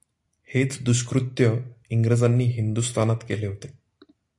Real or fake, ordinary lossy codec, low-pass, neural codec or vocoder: real; AAC, 64 kbps; 10.8 kHz; none